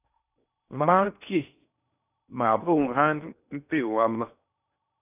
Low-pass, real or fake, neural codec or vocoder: 3.6 kHz; fake; codec, 16 kHz in and 24 kHz out, 0.6 kbps, FocalCodec, streaming, 4096 codes